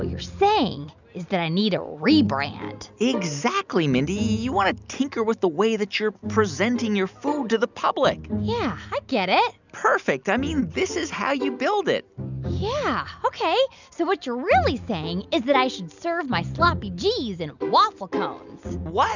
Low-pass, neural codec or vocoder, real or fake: 7.2 kHz; none; real